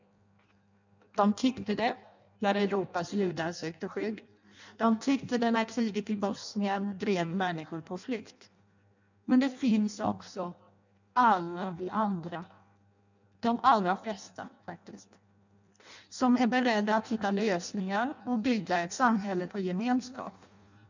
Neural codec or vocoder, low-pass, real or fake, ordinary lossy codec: codec, 16 kHz in and 24 kHz out, 0.6 kbps, FireRedTTS-2 codec; 7.2 kHz; fake; none